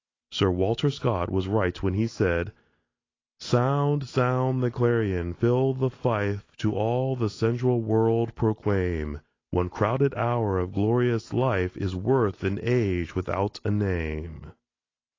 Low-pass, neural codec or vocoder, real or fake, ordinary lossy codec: 7.2 kHz; none; real; AAC, 32 kbps